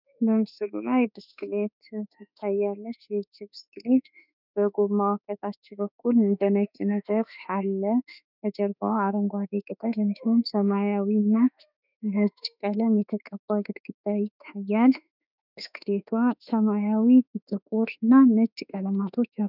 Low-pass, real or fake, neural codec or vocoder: 5.4 kHz; fake; autoencoder, 48 kHz, 32 numbers a frame, DAC-VAE, trained on Japanese speech